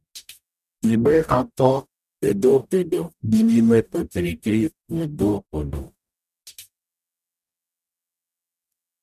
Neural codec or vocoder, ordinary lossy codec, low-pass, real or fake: codec, 44.1 kHz, 0.9 kbps, DAC; none; 14.4 kHz; fake